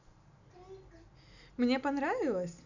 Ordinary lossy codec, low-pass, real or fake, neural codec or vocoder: none; 7.2 kHz; real; none